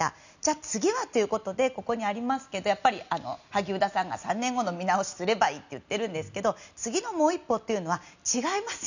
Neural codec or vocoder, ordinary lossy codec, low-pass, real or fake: none; none; 7.2 kHz; real